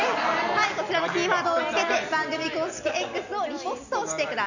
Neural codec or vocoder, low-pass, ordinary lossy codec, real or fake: none; 7.2 kHz; none; real